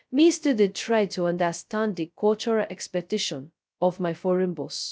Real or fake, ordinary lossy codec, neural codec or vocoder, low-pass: fake; none; codec, 16 kHz, 0.2 kbps, FocalCodec; none